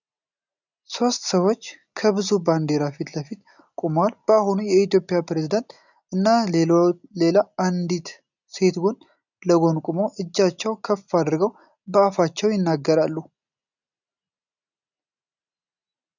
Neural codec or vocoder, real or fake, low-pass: none; real; 7.2 kHz